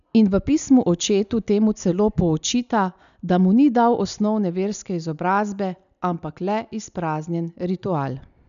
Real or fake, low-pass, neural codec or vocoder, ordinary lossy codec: real; 7.2 kHz; none; none